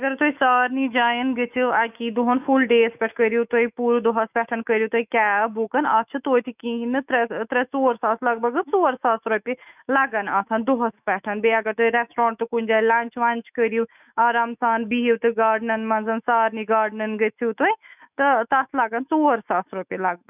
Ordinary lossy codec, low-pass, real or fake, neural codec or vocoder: none; 3.6 kHz; fake; autoencoder, 48 kHz, 128 numbers a frame, DAC-VAE, trained on Japanese speech